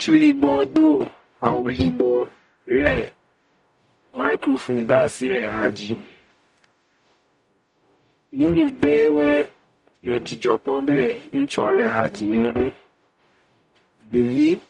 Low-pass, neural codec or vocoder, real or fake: 10.8 kHz; codec, 44.1 kHz, 0.9 kbps, DAC; fake